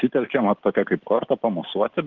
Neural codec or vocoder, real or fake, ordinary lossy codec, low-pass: none; real; Opus, 16 kbps; 7.2 kHz